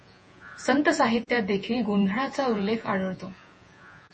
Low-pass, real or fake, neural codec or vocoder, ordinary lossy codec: 10.8 kHz; fake; vocoder, 48 kHz, 128 mel bands, Vocos; MP3, 32 kbps